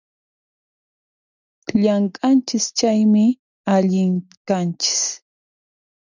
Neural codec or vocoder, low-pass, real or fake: none; 7.2 kHz; real